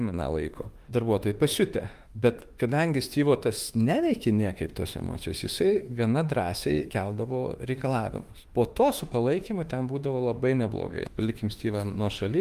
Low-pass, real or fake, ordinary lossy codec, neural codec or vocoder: 14.4 kHz; fake; Opus, 32 kbps; autoencoder, 48 kHz, 32 numbers a frame, DAC-VAE, trained on Japanese speech